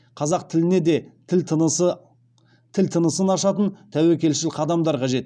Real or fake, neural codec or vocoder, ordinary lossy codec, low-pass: real; none; none; 9.9 kHz